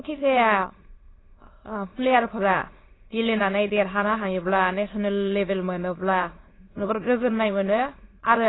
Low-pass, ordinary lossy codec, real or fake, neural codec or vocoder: 7.2 kHz; AAC, 16 kbps; fake; autoencoder, 22.05 kHz, a latent of 192 numbers a frame, VITS, trained on many speakers